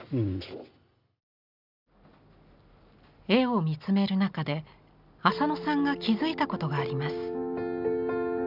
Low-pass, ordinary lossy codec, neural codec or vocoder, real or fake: 5.4 kHz; none; none; real